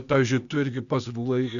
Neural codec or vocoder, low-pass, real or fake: codec, 16 kHz, 0.8 kbps, ZipCodec; 7.2 kHz; fake